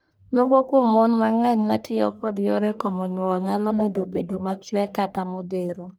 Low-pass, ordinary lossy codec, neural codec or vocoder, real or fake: none; none; codec, 44.1 kHz, 1.7 kbps, Pupu-Codec; fake